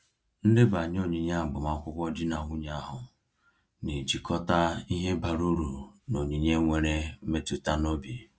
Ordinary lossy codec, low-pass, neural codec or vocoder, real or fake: none; none; none; real